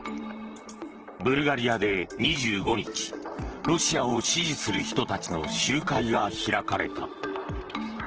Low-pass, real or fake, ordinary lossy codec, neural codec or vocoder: 7.2 kHz; fake; Opus, 16 kbps; vocoder, 44.1 kHz, 128 mel bands, Pupu-Vocoder